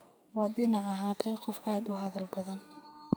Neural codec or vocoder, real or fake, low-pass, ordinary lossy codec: codec, 44.1 kHz, 2.6 kbps, SNAC; fake; none; none